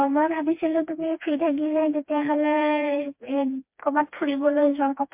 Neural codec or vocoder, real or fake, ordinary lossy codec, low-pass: codec, 16 kHz, 2 kbps, FreqCodec, smaller model; fake; MP3, 32 kbps; 3.6 kHz